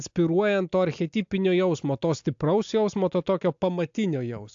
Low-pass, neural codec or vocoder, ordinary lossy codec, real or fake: 7.2 kHz; none; AAC, 64 kbps; real